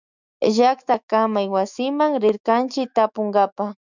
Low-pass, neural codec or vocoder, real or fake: 7.2 kHz; autoencoder, 48 kHz, 128 numbers a frame, DAC-VAE, trained on Japanese speech; fake